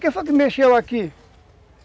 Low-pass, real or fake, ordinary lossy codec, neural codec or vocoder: none; real; none; none